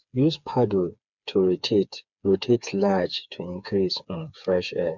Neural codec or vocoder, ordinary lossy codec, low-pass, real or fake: codec, 16 kHz, 4 kbps, FreqCodec, smaller model; none; 7.2 kHz; fake